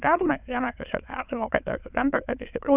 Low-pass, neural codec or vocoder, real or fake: 3.6 kHz; autoencoder, 22.05 kHz, a latent of 192 numbers a frame, VITS, trained on many speakers; fake